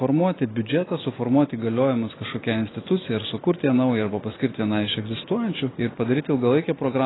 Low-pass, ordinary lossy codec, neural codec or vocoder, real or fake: 7.2 kHz; AAC, 16 kbps; none; real